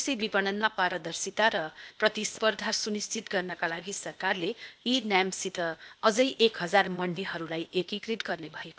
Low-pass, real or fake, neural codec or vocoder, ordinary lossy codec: none; fake; codec, 16 kHz, 0.8 kbps, ZipCodec; none